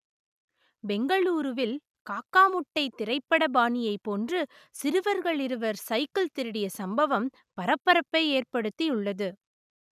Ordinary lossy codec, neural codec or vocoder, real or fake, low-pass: none; none; real; 14.4 kHz